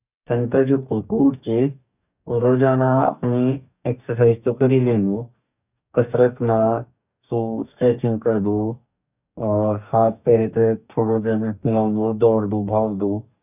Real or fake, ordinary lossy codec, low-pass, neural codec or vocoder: fake; none; 3.6 kHz; codec, 44.1 kHz, 2.6 kbps, DAC